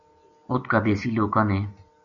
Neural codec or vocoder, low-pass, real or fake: none; 7.2 kHz; real